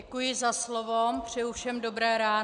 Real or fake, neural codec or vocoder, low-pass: real; none; 9.9 kHz